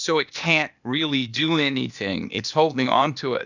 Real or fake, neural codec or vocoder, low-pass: fake; codec, 16 kHz, 0.8 kbps, ZipCodec; 7.2 kHz